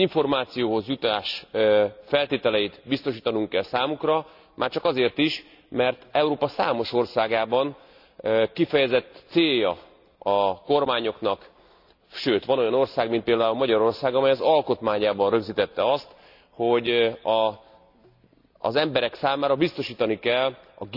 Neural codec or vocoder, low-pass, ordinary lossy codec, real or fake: none; 5.4 kHz; none; real